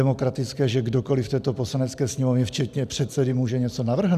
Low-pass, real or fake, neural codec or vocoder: 14.4 kHz; real; none